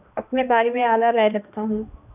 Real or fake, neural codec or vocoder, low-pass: fake; codec, 16 kHz, 1 kbps, X-Codec, HuBERT features, trained on balanced general audio; 3.6 kHz